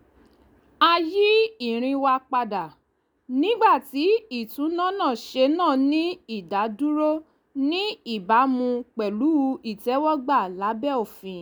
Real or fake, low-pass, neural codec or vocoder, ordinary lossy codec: real; none; none; none